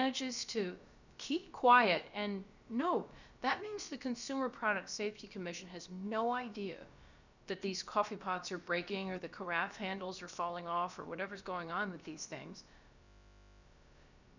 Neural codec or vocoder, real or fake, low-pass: codec, 16 kHz, about 1 kbps, DyCAST, with the encoder's durations; fake; 7.2 kHz